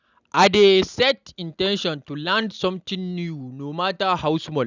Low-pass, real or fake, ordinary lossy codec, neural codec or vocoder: 7.2 kHz; real; none; none